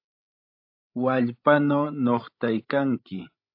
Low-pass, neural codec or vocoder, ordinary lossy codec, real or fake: 5.4 kHz; codec, 16 kHz, 16 kbps, FreqCodec, larger model; AAC, 48 kbps; fake